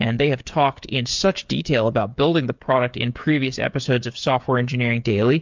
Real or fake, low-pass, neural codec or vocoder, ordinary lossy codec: fake; 7.2 kHz; codec, 16 kHz, 8 kbps, FreqCodec, smaller model; MP3, 64 kbps